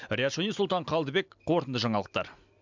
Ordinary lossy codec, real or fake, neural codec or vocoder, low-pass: MP3, 64 kbps; real; none; 7.2 kHz